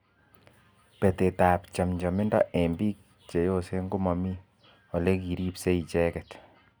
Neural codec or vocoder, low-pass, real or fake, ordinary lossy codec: none; none; real; none